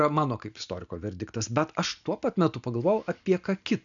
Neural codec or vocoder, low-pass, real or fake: none; 7.2 kHz; real